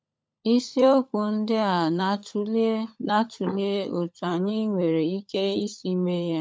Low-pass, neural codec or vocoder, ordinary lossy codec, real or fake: none; codec, 16 kHz, 16 kbps, FunCodec, trained on LibriTTS, 50 frames a second; none; fake